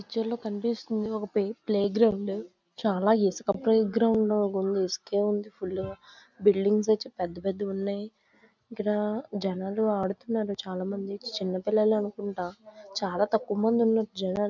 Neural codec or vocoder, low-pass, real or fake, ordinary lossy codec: none; 7.2 kHz; real; none